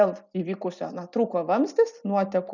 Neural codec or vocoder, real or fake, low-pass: none; real; 7.2 kHz